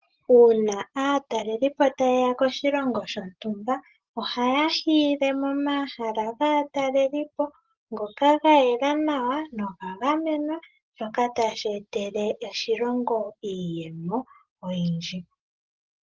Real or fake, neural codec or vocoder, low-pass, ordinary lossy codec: real; none; 7.2 kHz; Opus, 16 kbps